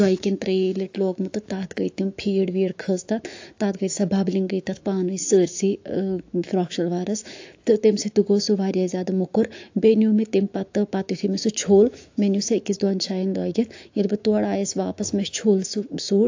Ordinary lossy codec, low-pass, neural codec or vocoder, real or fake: AAC, 48 kbps; 7.2 kHz; autoencoder, 48 kHz, 128 numbers a frame, DAC-VAE, trained on Japanese speech; fake